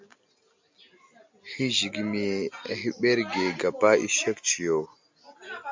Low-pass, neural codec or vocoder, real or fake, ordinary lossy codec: 7.2 kHz; none; real; MP3, 64 kbps